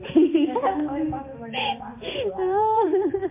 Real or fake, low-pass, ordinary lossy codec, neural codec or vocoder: fake; 3.6 kHz; MP3, 24 kbps; codec, 16 kHz, 2 kbps, X-Codec, HuBERT features, trained on balanced general audio